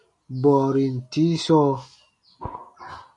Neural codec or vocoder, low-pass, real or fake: none; 10.8 kHz; real